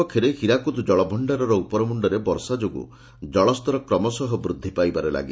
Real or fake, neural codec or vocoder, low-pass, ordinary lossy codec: real; none; none; none